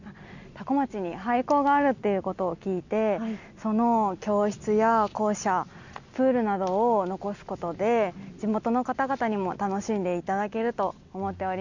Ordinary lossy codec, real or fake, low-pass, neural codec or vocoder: none; real; 7.2 kHz; none